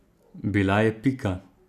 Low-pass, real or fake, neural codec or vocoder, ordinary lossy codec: 14.4 kHz; real; none; none